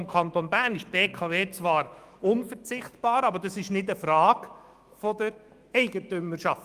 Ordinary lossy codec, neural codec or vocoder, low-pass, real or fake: Opus, 32 kbps; autoencoder, 48 kHz, 128 numbers a frame, DAC-VAE, trained on Japanese speech; 14.4 kHz; fake